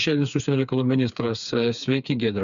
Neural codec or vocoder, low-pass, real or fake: codec, 16 kHz, 4 kbps, FreqCodec, smaller model; 7.2 kHz; fake